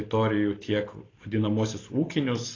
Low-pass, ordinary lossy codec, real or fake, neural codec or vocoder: 7.2 kHz; AAC, 32 kbps; real; none